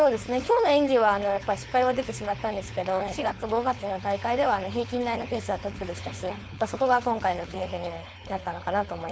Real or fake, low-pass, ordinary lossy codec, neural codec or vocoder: fake; none; none; codec, 16 kHz, 4.8 kbps, FACodec